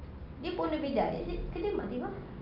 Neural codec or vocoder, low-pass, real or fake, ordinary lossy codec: none; 5.4 kHz; real; Opus, 24 kbps